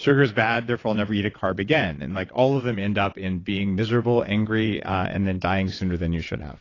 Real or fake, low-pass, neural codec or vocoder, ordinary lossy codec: fake; 7.2 kHz; vocoder, 22.05 kHz, 80 mel bands, WaveNeXt; AAC, 32 kbps